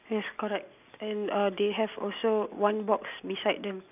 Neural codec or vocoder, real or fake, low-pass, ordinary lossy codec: none; real; 3.6 kHz; none